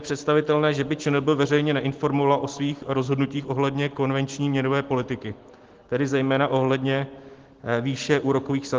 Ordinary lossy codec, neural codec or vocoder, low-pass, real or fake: Opus, 16 kbps; none; 7.2 kHz; real